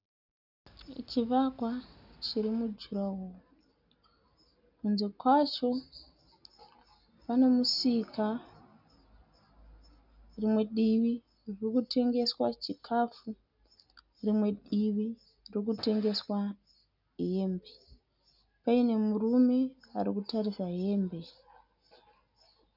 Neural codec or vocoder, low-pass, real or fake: none; 5.4 kHz; real